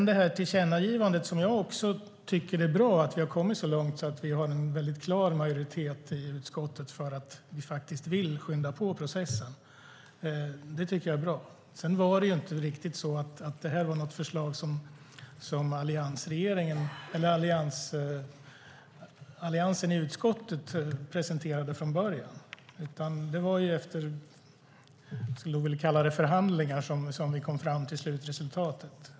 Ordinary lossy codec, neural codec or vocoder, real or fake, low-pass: none; none; real; none